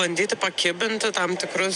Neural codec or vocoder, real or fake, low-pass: vocoder, 44.1 kHz, 128 mel bands every 256 samples, BigVGAN v2; fake; 10.8 kHz